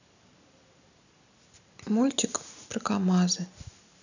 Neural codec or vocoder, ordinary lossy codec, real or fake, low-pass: none; none; real; 7.2 kHz